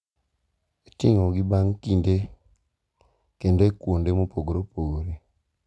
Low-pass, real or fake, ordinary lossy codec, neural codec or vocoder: none; real; none; none